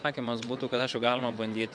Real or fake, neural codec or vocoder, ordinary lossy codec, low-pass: fake; vocoder, 22.05 kHz, 80 mel bands, WaveNeXt; MP3, 64 kbps; 9.9 kHz